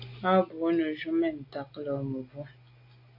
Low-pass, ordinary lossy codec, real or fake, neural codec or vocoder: 5.4 kHz; AAC, 32 kbps; real; none